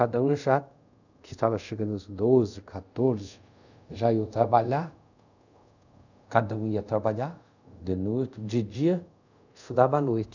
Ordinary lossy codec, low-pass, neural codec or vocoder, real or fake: none; 7.2 kHz; codec, 24 kHz, 0.5 kbps, DualCodec; fake